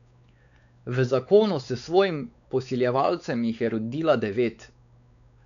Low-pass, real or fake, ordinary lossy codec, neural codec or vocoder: 7.2 kHz; fake; none; codec, 16 kHz, 4 kbps, X-Codec, WavLM features, trained on Multilingual LibriSpeech